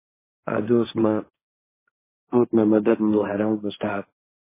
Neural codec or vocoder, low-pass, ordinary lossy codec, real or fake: codec, 16 kHz, 1.1 kbps, Voila-Tokenizer; 3.6 kHz; MP3, 16 kbps; fake